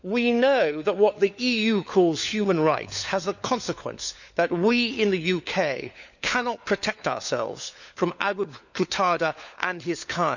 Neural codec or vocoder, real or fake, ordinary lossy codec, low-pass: codec, 16 kHz, 4 kbps, FunCodec, trained on LibriTTS, 50 frames a second; fake; none; 7.2 kHz